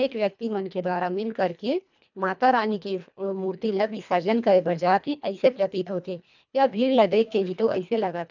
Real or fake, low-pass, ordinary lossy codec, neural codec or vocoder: fake; 7.2 kHz; none; codec, 24 kHz, 1.5 kbps, HILCodec